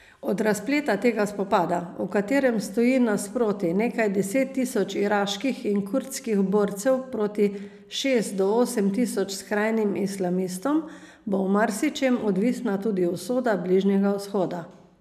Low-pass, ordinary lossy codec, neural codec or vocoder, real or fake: 14.4 kHz; none; none; real